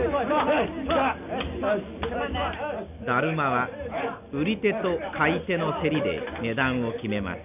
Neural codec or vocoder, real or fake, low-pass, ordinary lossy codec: none; real; 3.6 kHz; none